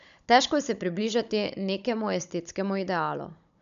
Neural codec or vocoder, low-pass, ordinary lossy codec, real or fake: codec, 16 kHz, 16 kbps, FunCodec, trained on Chinese and English, 50 frames a second; 7.2 kHz; none; fake